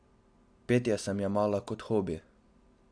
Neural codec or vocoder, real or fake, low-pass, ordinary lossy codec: none; real; 9.9 kHz; AAC, 64 kbps